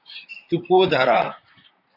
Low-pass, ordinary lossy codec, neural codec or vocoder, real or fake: 5.4 kHz; AAC, 48 kbps; vocoder, 44.1 kHz, 128 mel bands, Pupu-Vocoder; fake